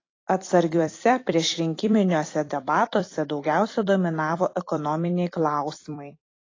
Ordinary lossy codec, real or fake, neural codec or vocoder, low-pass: AAC, 32 kbps; fake; vocoder, 44.1 kHz, 128 mel bands every 256 samples, BigVGAN v2; 7.2 kHz